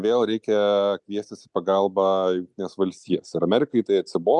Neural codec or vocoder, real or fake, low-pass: none; real; 10.8 kHz